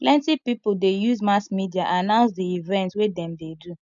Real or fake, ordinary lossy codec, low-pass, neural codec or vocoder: real; MP3, 96 kbps; 7.2 kHz; none